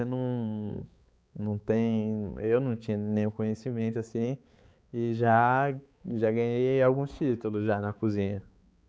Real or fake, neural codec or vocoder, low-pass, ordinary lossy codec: fake; codec, 16 kHz, 4 kbps, X-Codec, HuBERT features, trained on balanced general audio; none; none